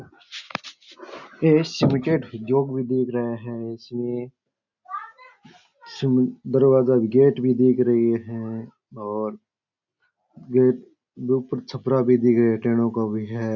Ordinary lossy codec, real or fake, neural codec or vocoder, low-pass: none; real; none; 7.2 kHz